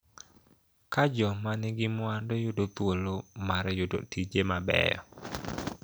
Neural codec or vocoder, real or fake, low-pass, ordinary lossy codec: none; real; none; none